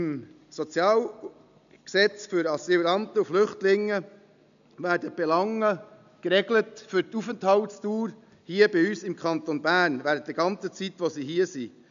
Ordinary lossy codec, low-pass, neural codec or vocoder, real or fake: none; 7.2 kHz; none; real